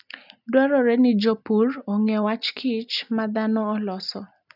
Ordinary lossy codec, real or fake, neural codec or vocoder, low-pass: none; real; none; 5.4 kHz